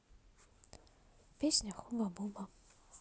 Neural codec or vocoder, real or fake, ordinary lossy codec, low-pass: none; real; none; none